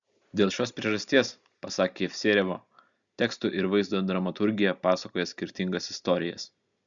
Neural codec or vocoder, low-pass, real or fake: none; 7.2 kHz; real